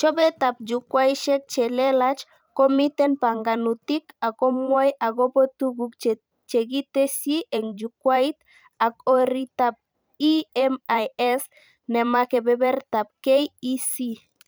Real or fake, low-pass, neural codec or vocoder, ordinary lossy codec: fake; none; vocoder, 44.1 kHz, 128 mel bands every 512 samples, BigVGAN v2; none